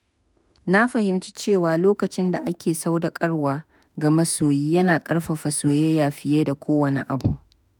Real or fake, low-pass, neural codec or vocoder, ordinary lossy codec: fake; none; autoencoder, 48 kHz, 32 numbers a frame, DAC-VAE, trained on Japanese speech; none